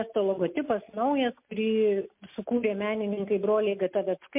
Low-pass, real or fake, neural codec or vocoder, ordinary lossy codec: 3.6 kHz; real; none; MP3, 32 kbps